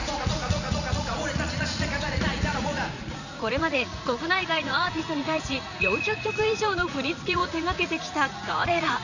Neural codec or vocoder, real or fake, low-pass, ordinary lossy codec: vocoder, 44.1 kHz, 80 mel bands, Vocos; fake; 7.2 kHz; none